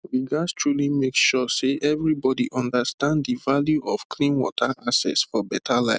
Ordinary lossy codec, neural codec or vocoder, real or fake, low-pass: none; none; real; none